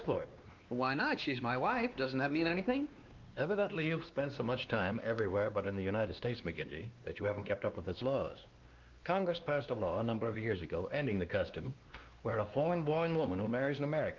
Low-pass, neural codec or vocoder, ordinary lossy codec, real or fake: 7.2 kHz; codec, 16 kHz, 2 kbps, X-Codec, WavLM features, trained on Multilingual LibriSpeech; Opus, 24 kbps; fake